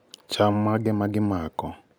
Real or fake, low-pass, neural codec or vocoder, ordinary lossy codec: real; none; none; none